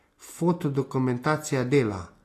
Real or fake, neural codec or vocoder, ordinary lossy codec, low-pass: real; none; AAC, 48 kbps; 14.4 kHz